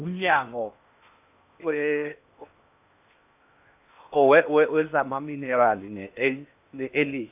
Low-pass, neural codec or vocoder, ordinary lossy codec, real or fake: 3.6 kHz; codec, 16 kHz in and 24 kHz out, 0.8 kbps, FocalCodec, streaming, 65536 codes; AAC, 32 kbps; fake